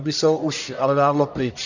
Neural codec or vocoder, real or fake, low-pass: codec, 44.1 kHz, 1.7 kbps, Pupu-Codec; fake; 7.2 kHz